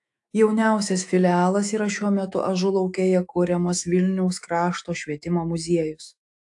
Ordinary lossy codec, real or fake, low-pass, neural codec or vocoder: AAC, 64 kbps; fake; 10.8 kHz; autoencoder, 48 kHz, 128 numbers a frame, DAC-VAE, trained on Japanese speech